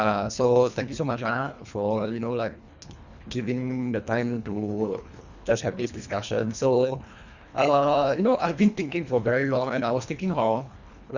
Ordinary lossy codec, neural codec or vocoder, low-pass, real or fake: Opus, 64 kbps; codec, 24 kHz, 1.5 kbps, HILCodec; 7.2 kHz; fake